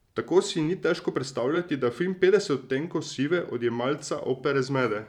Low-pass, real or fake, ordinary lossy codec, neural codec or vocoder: 19.8 kHz; fake; none; vocoder, 44.1 kHz, 128 mel bands every 512 samples, BigVGAN v2